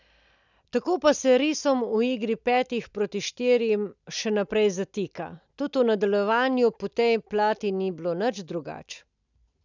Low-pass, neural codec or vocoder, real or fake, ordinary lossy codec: 7.2 kHz; none; real; none